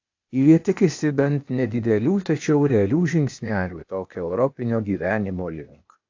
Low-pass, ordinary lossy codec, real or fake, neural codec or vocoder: 7.2 kHz; AAC, 48 kbps; fake; codec, 16 kHz, 0.8 kbps, ZipCodec